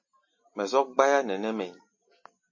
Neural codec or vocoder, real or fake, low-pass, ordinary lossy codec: none; real; 7.2 kHz; MP3, 32 kbps